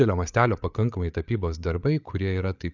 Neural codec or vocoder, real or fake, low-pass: codec, 16 kHz, 8 kbps, FreqCodec, larger model; fake; 7.2 kHz